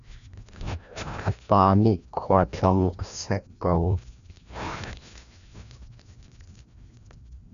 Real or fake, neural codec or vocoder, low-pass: fake; codec, 16 kHz, 1 kbps, FreqCodec, larger model; 7.2 kHz